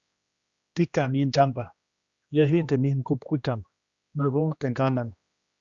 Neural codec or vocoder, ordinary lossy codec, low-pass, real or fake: codec, 16 kHz, 1 kbps, X-Codec, HuBERT features, trained on general audio; Opus, 64 kbps; 7.2 kHz; fake